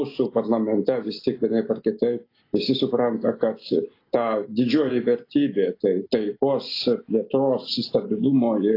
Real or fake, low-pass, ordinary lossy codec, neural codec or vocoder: real; 5.4 kHz; AAC, 32 kbps; none